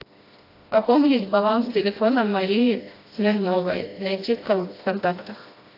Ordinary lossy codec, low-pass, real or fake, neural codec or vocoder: AAC, 32 kbps; 5.4 kHz; fake; codec, 16 kHz, 1 kbps, FreqCodec, smaller model